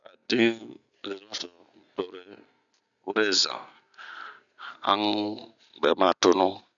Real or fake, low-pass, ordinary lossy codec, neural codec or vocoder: real; 7.2 kHz; none; none